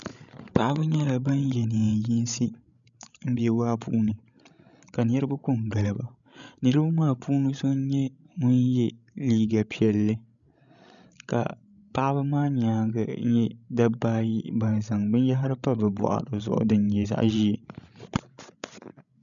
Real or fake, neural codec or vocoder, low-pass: fake; codec, 16 kHz, 16 kbps, FreqCodec, larger model; 7.2 kHz